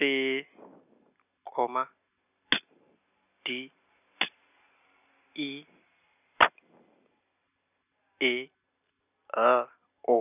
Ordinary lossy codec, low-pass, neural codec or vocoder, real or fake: none; 3.6 kHz; none; real